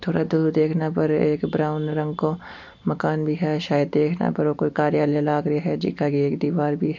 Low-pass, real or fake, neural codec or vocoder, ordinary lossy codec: 7.2 kHz; real; none; MP3, 48 kbps